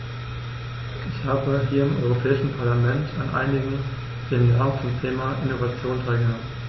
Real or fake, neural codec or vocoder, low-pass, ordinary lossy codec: real; none; 7.2 kHz; MP3, 24 kbps